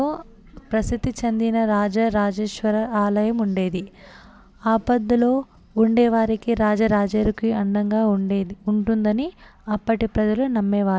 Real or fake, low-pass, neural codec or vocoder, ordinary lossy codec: real; none; none; none